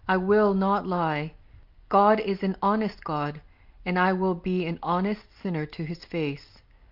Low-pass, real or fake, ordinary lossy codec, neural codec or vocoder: 5.4 kHz; real; Opus, 24 kbps; none